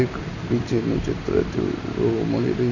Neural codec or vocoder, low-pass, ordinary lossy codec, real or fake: none; 7.2 kHz; none; real